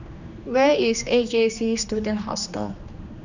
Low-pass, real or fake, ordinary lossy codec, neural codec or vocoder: 7.2 kHz; fake; none; codec, 16 kHz, 2 kbps, X-Codec, HuBERT features, trained on general audio